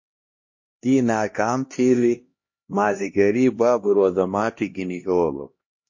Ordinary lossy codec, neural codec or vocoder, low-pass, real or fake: MP3, 32 kbps; codec, 16 kHz, 1 kbps, X-Codec, HuBERT features, trained on LibriSpeech; 7.2 kHz; fake